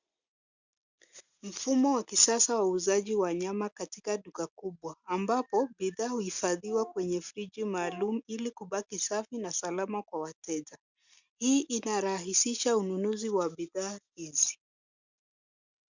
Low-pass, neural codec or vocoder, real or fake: 7.2 kHz; none; real